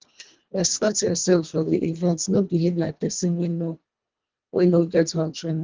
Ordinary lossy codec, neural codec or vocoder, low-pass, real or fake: Opus, 32 kbps; codec, 24 kHz, 1.5 kbps, HILCodec; 7.2 kHz; fake